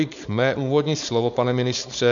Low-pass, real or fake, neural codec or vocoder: 7.2 kHz; fake; codec, 16 kHz, 4.8 kbps, FACodec